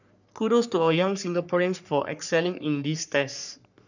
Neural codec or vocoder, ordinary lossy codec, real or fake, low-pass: codec, 44.1 kHz, 3.4 kbps, Pupu-Codec; none; fake; 7.2 kHz